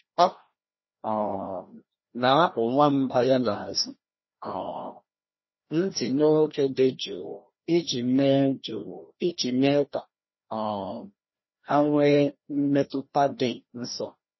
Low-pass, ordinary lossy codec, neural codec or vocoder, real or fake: 7.2 kHz; MP3, 24 kbps; codec, 16 kHz, 1 kbps, FreqCodec, larger model; fake